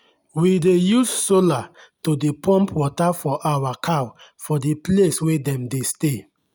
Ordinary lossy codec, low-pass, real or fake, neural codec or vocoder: none; none; real; none